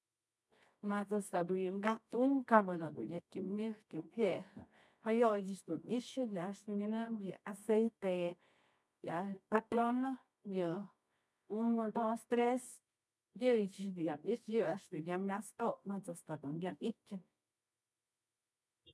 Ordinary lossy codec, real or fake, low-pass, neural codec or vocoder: none; fake; none; codec, 24 kHz, 0.9 kbps, WavTokenizer, medium music audio release